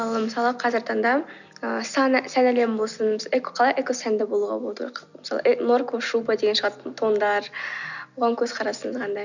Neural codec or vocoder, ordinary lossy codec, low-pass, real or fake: none; none; 7.2 kHz; real